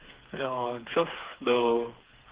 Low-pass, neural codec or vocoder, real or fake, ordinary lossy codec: 3.6 kHz; codec, 16 kHz, 4 kbps, FreqCodec, smaller model; fake; Opus, 16 kbps